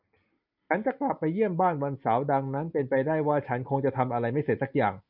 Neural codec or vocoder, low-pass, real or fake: none; 5.4 kHz; real